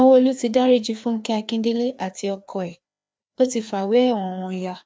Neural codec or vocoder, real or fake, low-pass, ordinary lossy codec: codec, 16 kHz, 2 kbps, FreqCodec, larger model; fake; none; none